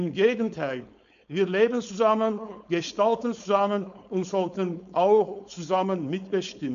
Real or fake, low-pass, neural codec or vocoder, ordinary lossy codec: fake; 7.2 kHz; codec, 16 kHz, 4.8 kbps, FACodec; none